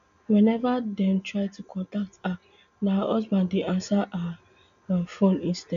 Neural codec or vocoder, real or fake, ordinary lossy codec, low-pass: none; real; none; 7.2 kHz